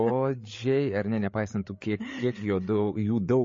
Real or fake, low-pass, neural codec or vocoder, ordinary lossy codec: fake; 7.2 kHz; codec, 16 kHz, 8 kbps, FreqCodec, larger model; MP3, 32 kbps